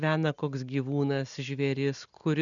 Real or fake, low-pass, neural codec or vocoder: real; 7.2 kHz; none